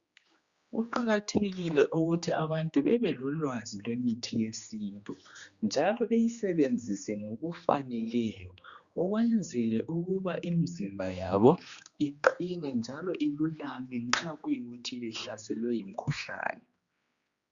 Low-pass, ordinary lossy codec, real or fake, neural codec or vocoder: 7.2 kHz; Opus, 64 kbps; fake; codec, 16 kHz, 2 kbps, X-Codec, HuBERT features, trained on general audio